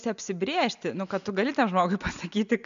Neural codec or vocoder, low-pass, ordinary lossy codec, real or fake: none; 7.2 kHz; AAC, 96 kbps; real